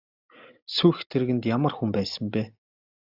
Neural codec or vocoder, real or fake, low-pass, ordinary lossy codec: none; real; 5.4 kHz; AAC, 48 kbps